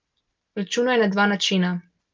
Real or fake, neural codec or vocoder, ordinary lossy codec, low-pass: real; none; Opus, 32 kbps; 7.2 kHz